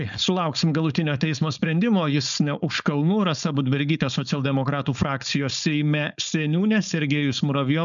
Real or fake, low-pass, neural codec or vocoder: fake; 7.2 kHz; codec, 16 kHz, 4.8 kbps, FACodec